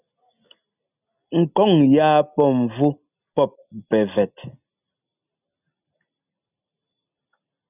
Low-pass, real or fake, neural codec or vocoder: 3.6 kHz; real; none